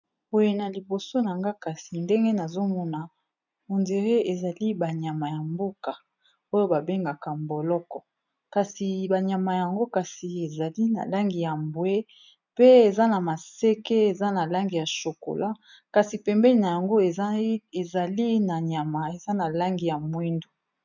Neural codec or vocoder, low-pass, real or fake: none; 7.2 kHz; real